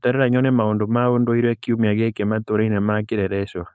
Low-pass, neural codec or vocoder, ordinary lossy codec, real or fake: none; codec, 16 kHz, 4.8 kbps, FACodec; none; fake